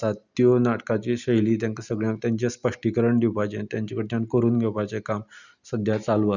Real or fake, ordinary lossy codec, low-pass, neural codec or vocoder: real; none; 7.2 kHz; none